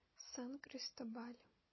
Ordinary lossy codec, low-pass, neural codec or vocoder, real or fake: MP3, 24 kbps; 7.2 kHz; none; real